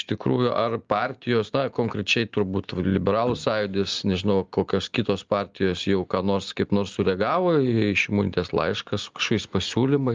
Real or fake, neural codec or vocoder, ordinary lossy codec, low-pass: real; none; Opus, 32 kbps; 7.2 kHz